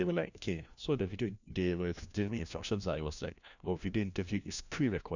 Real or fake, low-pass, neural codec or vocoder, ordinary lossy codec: fake; 7.2 kHz; codec, 16 kHz, 1 kbps, FunCodec, trained on LibriTTS, 50 frames a second; none